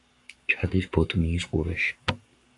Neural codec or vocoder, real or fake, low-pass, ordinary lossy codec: codec, 44.1 kHz, 7.8 kbps, Pupu-Codec; fake; 10.8 kHz; AAC, 64 kbps